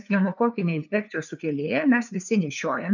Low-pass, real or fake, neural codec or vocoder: 7.2 kHz; fake; codec, 16 kHz, 2 kbps, FunCodec, trained on LibriTTS, 25 frames a second